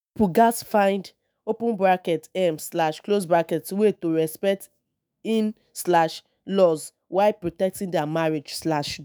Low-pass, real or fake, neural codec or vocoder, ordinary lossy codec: none; fake; autoencoder, 48 kHz, 128 numbers a frame, DAC-VAE, trained on Japanese speech; none